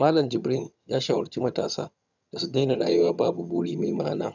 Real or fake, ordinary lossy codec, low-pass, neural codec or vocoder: fake; none; 7.2 kHz; vocoder, 22.05 kHz, 80 mel bands, HiFi-GAN